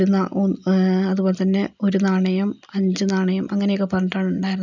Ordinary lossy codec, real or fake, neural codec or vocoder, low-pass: none; real; none; 7.2 kHz